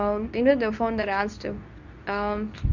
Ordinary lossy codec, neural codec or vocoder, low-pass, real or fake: none; codec, 24 kHz, 0.9 kbps, WavTokenizer, medium speech release version 2; 7.2 kHz; fake